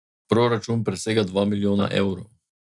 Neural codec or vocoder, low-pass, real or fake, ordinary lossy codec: none; 10.8 kHz; real; none